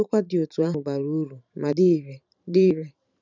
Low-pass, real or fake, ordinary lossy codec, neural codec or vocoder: 7.2 kHz; fake; none; vocoder, 44.1 kHz, 128 mel bands every 512 samples, BigVGAN v2